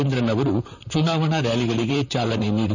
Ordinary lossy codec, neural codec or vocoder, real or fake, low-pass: none; vocoder, 44.1 kHz, 128 mel bands, Pupu-Vocoder; fake; 7.2 kHz